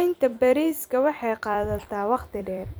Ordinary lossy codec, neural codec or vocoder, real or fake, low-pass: none; vocoder, 44.1 kHz, 128 mel bands every 256 samples, BigVGAN v2; fake; none